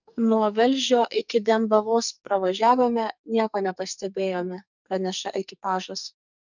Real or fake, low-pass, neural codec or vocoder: fake; 7.2 kHz; codec, 44.1 kHz, 2.6 kbps, SNAC